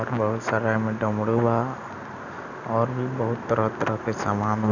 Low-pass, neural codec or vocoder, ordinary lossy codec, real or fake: 7.2 kHz; none; none; real